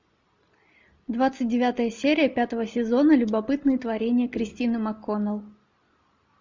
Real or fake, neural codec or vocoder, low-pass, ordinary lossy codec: real; none; 7.2 kHz; MP3, 64 kbps